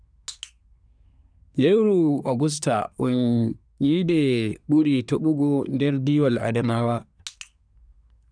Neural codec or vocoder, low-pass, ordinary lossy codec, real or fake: codec, 24 kHz, 1 kbps, SNAC; 9.9 kHz; none; fake